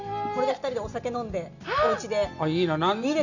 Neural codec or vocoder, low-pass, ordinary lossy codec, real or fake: none; 7.2 kHz; none; real